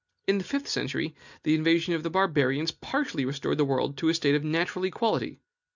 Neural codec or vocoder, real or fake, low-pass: none; real; 7.2 kHz